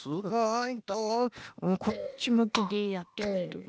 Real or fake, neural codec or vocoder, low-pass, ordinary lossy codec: fake; codec, 16 kHz, 0.8 kbps, ZipCodec; none; none